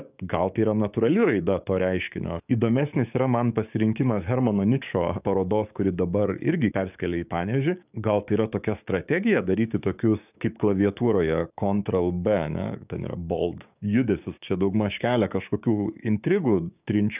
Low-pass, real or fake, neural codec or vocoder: 3.6 kHz; fake; codec, 16 kHz, 6 kbps, DAC